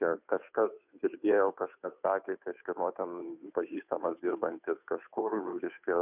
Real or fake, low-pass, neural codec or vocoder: fake; 3.6 kHz; codec, 16 kHz, 2 kbps, FunCodec, trained on Chinese and English, 25 frames a second